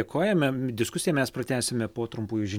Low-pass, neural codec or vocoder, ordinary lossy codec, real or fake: 19.8 kHz; none; MP3, 96 kbps; real